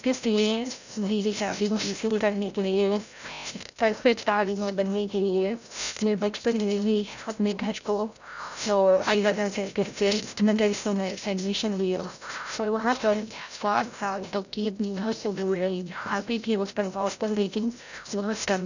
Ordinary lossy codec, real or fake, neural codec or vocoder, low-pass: none; fake; codec, 16 kHz, 0.5 kbps, FreqCodec, larger model; 7.2 kHz